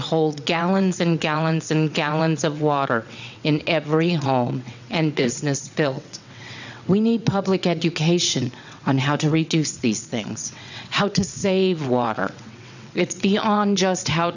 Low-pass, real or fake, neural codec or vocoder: 7.2 kHz; fake; vocoder, 22.05 kHz, 80 mel bands, WaveNeXt